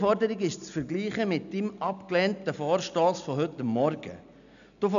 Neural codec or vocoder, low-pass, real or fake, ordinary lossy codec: none; 7.2 kHz; real; none